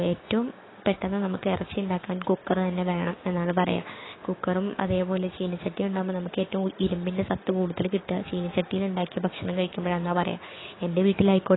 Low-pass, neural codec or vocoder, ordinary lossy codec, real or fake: 7.2 kHz; codec, 16 kHz, 6 kbps, DAC; AAC, 16 kbps; fake